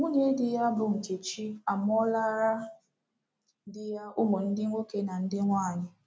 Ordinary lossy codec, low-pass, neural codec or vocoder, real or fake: none; none; none; real